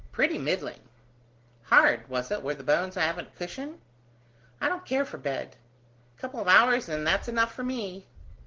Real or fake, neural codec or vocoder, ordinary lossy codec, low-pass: real; none; Opus, 16 kbps; 7.2 kHz